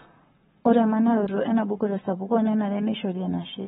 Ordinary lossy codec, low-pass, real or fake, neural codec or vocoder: AAC, 16 kbps; 19.8 kHz; fake; codec, 44.1 kHz, 7.8 kbps, Pupu-Codec